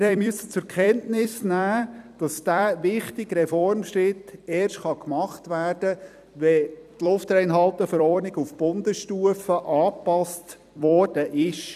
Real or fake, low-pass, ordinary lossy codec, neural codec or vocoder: fake; 14.4 kHz; none; vocoder, 44.1 kHz, 128 mel bands every 256 samples, BigVGAN v2